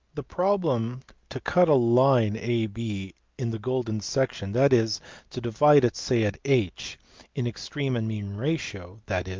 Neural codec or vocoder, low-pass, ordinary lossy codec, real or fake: none; 7.2 kHz; Opus, 24 kbps; real